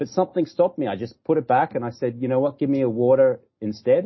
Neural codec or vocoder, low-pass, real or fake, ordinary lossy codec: none; 7.2 kHz; real; MP3, 24 kbps